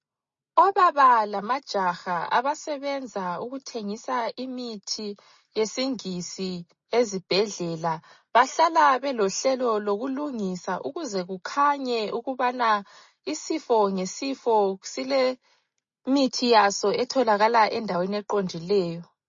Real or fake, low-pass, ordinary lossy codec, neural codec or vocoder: real; 7.2 kHz; MP3, 32 kbps; none